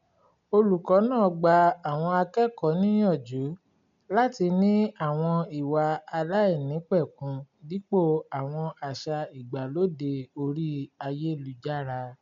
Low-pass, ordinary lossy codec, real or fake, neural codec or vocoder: 7.2 kHz; MP3, 64 kbps; real; none